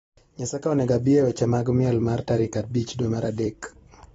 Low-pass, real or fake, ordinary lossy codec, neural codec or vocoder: 19.8 kHz; fake; AAC, 24 kbps; vocoder, 44.1 kHz, 128 mel bands every 256 samples, BigVGAN v2